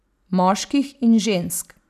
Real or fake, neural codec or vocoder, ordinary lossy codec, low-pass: real; none; none; 14.4 kHz